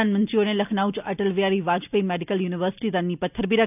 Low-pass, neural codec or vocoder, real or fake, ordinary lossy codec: 3.6 kHz; none; real; none